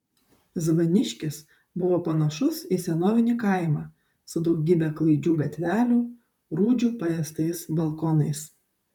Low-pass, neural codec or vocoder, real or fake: 19.8 kHz; vocoder, 44.1 kHz, 128 mel bands, Pupu-Vocoder; fake